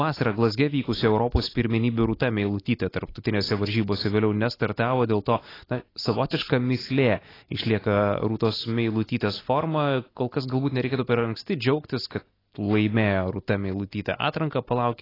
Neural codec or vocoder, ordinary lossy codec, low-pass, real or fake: none; AAC, 24 kbps; 5.4 kHz; real